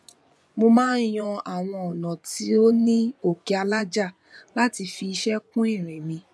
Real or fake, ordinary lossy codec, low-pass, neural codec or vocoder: fake; none; none; vocoder, 24 kHz, 100 mel bands, Vocos